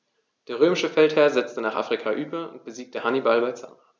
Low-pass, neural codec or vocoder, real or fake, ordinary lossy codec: none; none; real; none